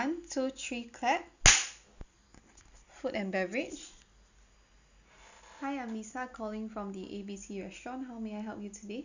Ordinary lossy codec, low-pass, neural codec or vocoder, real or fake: none; 7.2 kHz; none; real